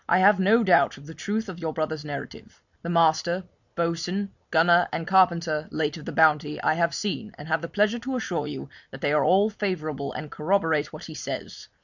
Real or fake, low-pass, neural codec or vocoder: real; 7.2 kHz; none